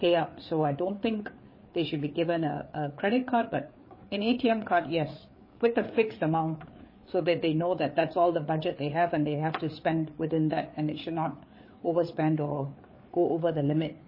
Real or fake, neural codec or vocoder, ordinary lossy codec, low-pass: fake; codec, 16 kHz, 4 kbps, FreqCodec, larger model; MP3, 24 kbps; 5.4 kHz